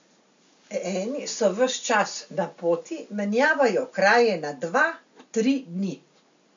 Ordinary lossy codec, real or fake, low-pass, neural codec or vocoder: MP3, 64 kbps; real; 7.2 kHz; none